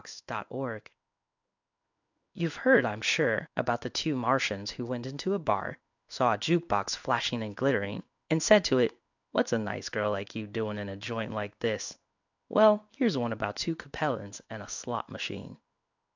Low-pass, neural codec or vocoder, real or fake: 7.2 kHz; codec, 16 kHz in and 24 kHz out, 1 kbps, XY-Tokenizer; fake